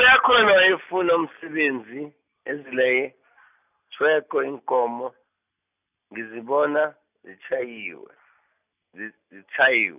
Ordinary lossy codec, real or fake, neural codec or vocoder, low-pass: none; real; none; 3.6 kHz